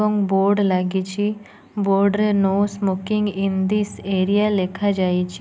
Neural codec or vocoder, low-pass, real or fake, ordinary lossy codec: none; none; real; none